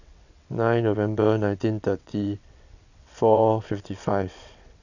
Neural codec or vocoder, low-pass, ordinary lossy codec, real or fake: vocoder, 22.05 kHz, 80 mel bands, WaveNeXt; 7.2 kHz; none; fake